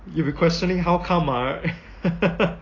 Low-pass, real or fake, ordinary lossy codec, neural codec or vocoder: 7.2 kHz; real; AAC, 32 kbps; none